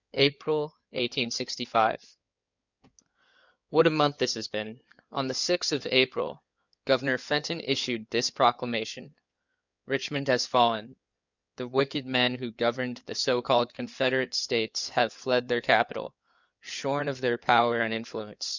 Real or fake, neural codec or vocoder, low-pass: fake; codec, 16 kHz in and 24 kHz out, 2.2 kbps, FireRedTTS-2 codec; 7.2 kHz